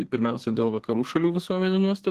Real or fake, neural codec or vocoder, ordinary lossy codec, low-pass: fake; codec, 32 kHz, 1.9 kbps, SNAC; Opus, 16 kbps; 14.4 kHz